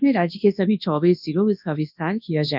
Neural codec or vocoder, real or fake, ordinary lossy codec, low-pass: codec, 24 kHz, 0.9 kbps, WavTokenizer, large speech release; fake; none; 5.4 kHz